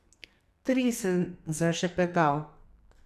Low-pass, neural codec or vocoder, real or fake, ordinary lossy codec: 14.4 kHz; codec, 44.1 kHz, 2.6 kbps, SNAC; fake; none